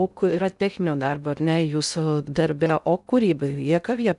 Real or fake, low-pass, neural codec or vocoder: fake; 10.8 kHz; codec, 16 kHz in and 24 kHz out, 0.6 kbps, FocalCodec, streaming, 2048 codes